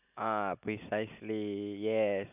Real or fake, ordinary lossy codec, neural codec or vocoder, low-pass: real; none; none; 3.6 kHz